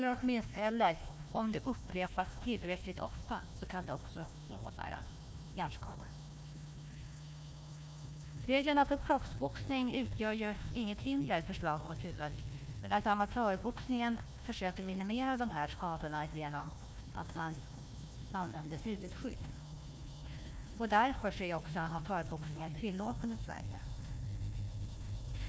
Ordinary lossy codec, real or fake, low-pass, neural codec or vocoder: none; fake; none; codec, 16 kHz, 1 kbps, FunCodec, trained on Chinese and English, 50 frames a second